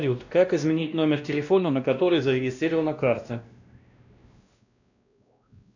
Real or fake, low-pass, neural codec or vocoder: fake; 7.2 kHz; codec, 16 kHz, 1 kbps, X-Codec, WavLM features, trained on Multilingual LibriSpeech